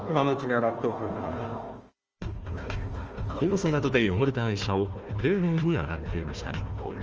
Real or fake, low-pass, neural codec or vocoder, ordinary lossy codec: fake; 7.2 kHz; codec, 16 kHz, 1 kbps, FunCodec, trained on Chinese and English, 50 frames a second; Opus, 24 kbps